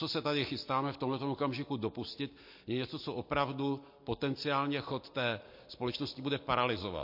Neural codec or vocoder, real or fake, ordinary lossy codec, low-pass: none; real; MP3, 32 kbps; 5.4 kHz